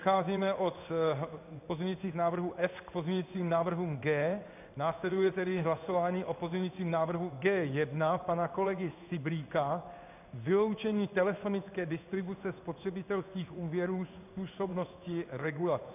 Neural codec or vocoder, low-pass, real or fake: codec, 16 kHz in and 24 kHz out, 1 kbps, XY-Tokenizer; 3.6 kHz; fake